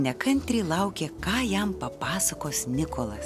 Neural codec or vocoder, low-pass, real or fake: none; 14.4 kHz; real